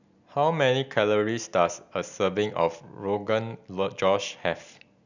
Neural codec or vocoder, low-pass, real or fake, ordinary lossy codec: none; 7.2 kHz; real; none